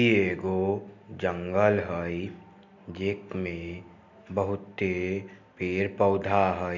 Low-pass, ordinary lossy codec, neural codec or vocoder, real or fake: 7.2 kHz; none; none; real